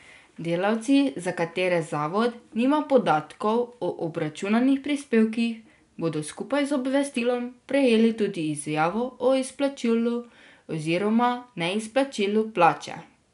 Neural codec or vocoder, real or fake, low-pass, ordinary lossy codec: none; real; 10.8 kHz; none